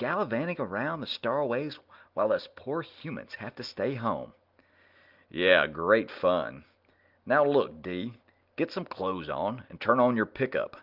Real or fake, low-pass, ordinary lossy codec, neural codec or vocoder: real; 5.4 kHz; Opus, 32 kbps; none